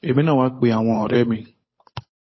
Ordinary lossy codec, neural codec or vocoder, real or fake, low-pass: MP3, 24 kbps; codec, 16 kHz, 8 kbps, FunCodec, trained on Chinese and English, 25 frames a second; fake; 7.2 kHz